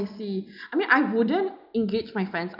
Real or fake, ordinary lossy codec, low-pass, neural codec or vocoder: real; none; 5.4 kHz; none